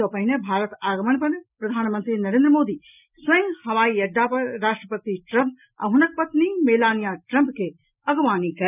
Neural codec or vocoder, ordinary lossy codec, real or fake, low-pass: none; none; real; 3.6 kHz